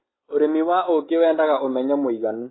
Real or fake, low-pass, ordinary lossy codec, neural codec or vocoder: real; 7.2 kHz; AAC, 16 kbps; none